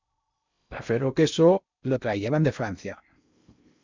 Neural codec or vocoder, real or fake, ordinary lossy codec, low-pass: codec, 16 kHz in and 24 kHz out, 0.6 kbps, FocalCodec, streaming, 2048 codes; fake; MP3, 64 kbps; 7.2 kHz